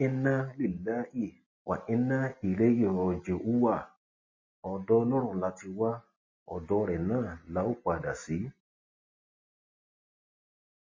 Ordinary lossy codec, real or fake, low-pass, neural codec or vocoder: MP3, 32 kbps; real; 7.2 kHz; none